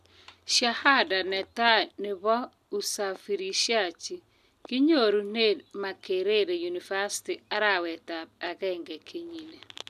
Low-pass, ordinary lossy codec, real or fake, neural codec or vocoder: 14.4 kHz; none; real; none